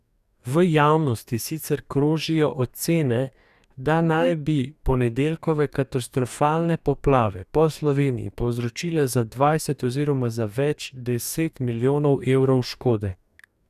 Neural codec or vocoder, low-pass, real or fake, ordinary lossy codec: codec, 44.1 kHz, 2.6 kbps, DAC; 14.4 kHz; fake; none